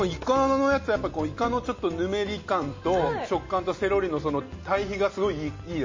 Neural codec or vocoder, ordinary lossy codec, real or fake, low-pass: none; MP3, 32 kbps; real; 7.2 kHz